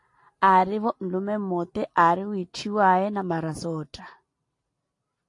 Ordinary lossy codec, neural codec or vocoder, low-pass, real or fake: AAC, 48 kbps; none; 10.8 kHz; real